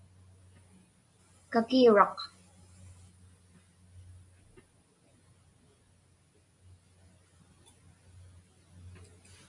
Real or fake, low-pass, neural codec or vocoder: real; 10.8 kHz; none